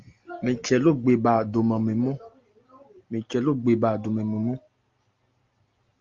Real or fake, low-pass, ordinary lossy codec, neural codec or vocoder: real; 7.2 kHz; Opus, 32 kbps; none